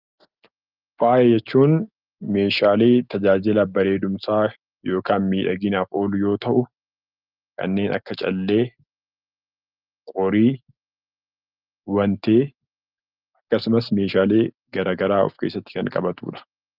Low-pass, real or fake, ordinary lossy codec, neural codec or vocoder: 5.4 kHz; real; Opus, 24 kbps; none